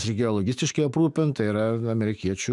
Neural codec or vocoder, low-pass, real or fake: autoencoder, 48 kHz, 128 numbers a frame, DAC-VAE, trained on Japanese speech; 10.8 kHz; fake